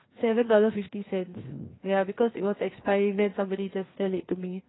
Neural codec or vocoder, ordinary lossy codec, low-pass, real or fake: codec, 16 kHz, 2 kbps, FreqCodec, larger model; AAC, 16 kbps; 7.2 kHz; fake